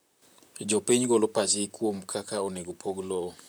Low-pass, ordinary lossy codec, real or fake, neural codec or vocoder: none; none; fake; vocoder, 44.1 kHz, 128 mel bands, Pupu-Vocoder